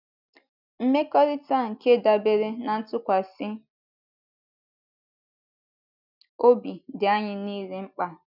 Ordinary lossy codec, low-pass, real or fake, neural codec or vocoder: none; 5.4 kHz; real; none